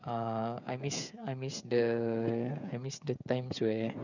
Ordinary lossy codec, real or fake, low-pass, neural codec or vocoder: none; fake; 7.2 kHz; codec, 16 kHz, 16 kbps, FreqCodec, smaller model